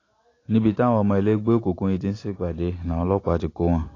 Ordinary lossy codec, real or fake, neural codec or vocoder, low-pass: AAC, 32 kbps; real; none; 7.2 kHz